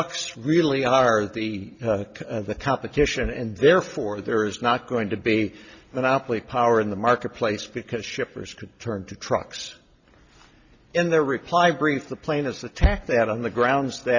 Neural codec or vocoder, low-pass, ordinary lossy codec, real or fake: none; 7.2 kHz; Opus, 64 kbps; real